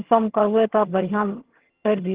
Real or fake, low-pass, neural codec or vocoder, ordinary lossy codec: fake; 3.6 kHz; vocoder, 22.05 kHz, 80 mel bands, HiFi-GAN; Opus, 16 kbps